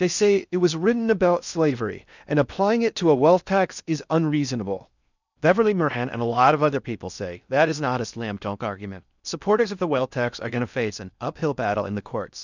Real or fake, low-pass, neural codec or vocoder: fake; 7.2 kHz; codec, 16 kHz in and 24 kHz out, 0.6 kbps, FocalCodec, streaming, 2048 codes